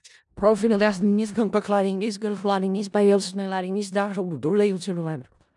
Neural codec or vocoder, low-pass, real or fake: codec, 16 kHz in and 24 kHz out, 0.4 kbps, LongCat-Audio-Codec, four codebook decoder; 10.8 kHz; fake